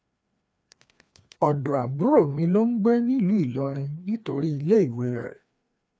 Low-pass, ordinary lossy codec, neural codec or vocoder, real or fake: none; none; codec, 16 kHz, 2 kbps, FreqCodec, larger model; fake